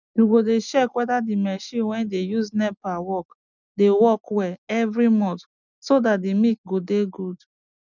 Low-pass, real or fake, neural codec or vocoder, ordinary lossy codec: 7.2 kHz; real; none; none